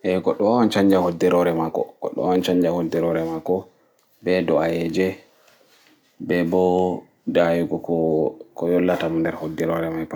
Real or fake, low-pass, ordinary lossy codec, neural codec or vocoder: real; none; none; none